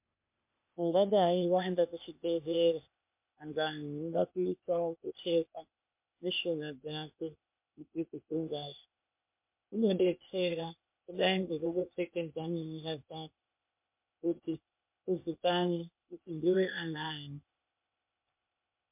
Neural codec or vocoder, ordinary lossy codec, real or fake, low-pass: codec, 16 kHz, 0.8 kbps, ZipCodec; MP3, 32 kbps; fake; 3.6 kHz